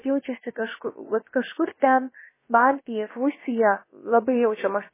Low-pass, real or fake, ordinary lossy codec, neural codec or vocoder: 3.6 kHz; fake; MP3, 16 kbps; codec, 16 kHz, about 1 kbps, DyCAST, with the encoder's durations